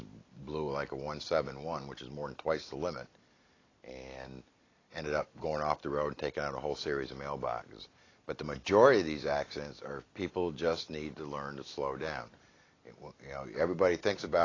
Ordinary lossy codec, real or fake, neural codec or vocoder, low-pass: AAC, 32 kbps; real; none; 7.2 kHz